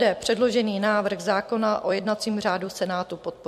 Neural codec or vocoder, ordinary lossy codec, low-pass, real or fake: vocoder, 44.1 kHz, 128 mel bands every 256 samples, BigVGAN v2; MP3, 64 kbps; 14.4 kHz; fake